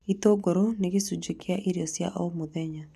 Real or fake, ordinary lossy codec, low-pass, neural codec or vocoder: real; none; 14.4 kHz; none